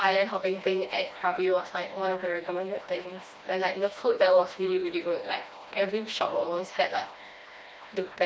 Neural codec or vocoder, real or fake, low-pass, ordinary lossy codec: codec, 16 kHz, 1 kbps, FreqCodec, smaller model; fake; none; none